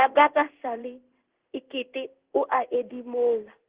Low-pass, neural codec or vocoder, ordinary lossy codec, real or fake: 3.6 kHz; codec, 16 kHz in and 24 kHz out, 1 kbps, XY-Tokenizer; Opus, 16 kbps; fake